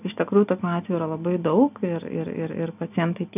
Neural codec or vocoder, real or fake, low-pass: none; real; 3.6 kHz